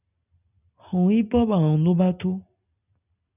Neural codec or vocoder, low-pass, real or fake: none; 3.6 kHz; real